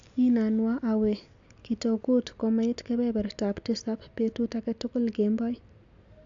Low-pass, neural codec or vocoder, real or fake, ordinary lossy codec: 7.2 kHz; none; real; AAC, 64 kbps